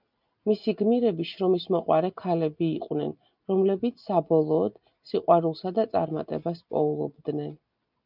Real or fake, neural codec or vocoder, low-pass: real; none; 5.4 kHz